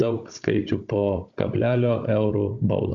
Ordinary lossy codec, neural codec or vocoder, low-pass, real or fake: AAC, 64 kbps; codec, 16 kHz, 16 kbps, FunCodec, trained on Chinese and English, 50 frames a second; 7.2 kHz; fake